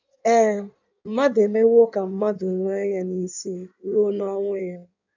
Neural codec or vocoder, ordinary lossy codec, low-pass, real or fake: codec, 16 kHz in and 24 kHz out, 1.1 kbps, FireRedTTS-2 codec; none; 7.2 kHz; fake